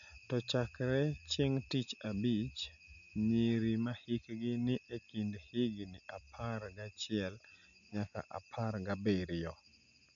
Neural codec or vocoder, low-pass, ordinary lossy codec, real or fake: none; 7.2 kHz; none; real